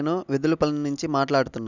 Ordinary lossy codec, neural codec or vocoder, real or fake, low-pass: none; none; real; 7.2 kHz